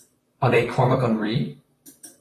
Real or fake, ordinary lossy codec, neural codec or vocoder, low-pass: fake; AAC, 48 kbps; vocoder, 44.1 kHz, 128 mel bands, Pupu-Vocoder; 14.4 kHz